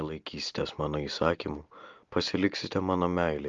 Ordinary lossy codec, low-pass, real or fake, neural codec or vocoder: Opus, 32 kbps; 7.2 kHz; real; none